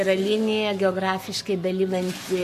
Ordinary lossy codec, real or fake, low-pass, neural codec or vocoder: MP3, 64 kbps; fake; 14.4 kHz; codec, 44.1 kHz, 7.8 kbps, Pupu-Codec